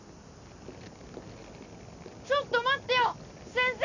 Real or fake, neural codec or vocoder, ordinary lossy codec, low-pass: real; none; none; 7.2 kHz